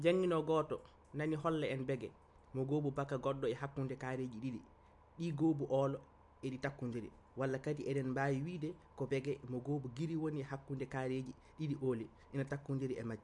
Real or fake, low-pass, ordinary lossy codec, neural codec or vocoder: real; 10.8 kHz; MP3, 64 kbps; none